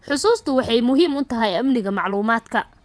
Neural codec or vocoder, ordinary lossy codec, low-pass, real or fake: vocoder, 22.05 kHz, 80 mel bands, WaveNeXt; none; none; fake